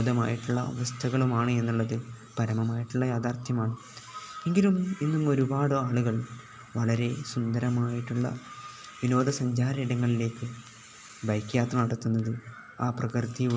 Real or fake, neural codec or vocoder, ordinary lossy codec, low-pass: real; none; none; none